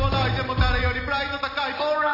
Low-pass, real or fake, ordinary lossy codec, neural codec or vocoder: 5.4 kHz; real; none; none